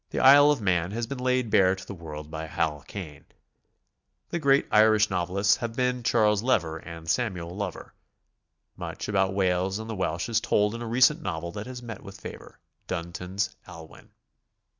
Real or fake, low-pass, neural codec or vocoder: real; 7.2 kHz; none